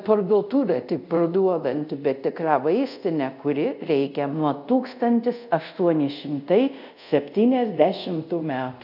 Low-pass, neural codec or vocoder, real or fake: 5.4 kHz; codec, 24 kHz, 0.5 kbps, DualCodec; fake